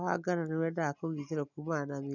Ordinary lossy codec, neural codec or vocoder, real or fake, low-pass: none; none; real; 7.2 kHz